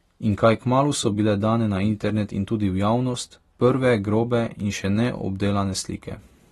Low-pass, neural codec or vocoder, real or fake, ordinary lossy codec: 19.8 kHz; none; real; AAC, 32 kbps